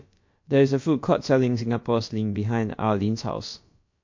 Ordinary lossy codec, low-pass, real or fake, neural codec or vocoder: MP3, 48 kbps; 7.2 kHz; fake; codec, 16 kHz, about 1 kbps, DyCAST, with the encoder's durations